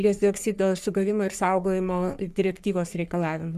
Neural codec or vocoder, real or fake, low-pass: codec, 44.1 kHz, 3.4 kbps, Pupu-Codec; fake; 14.4 kHz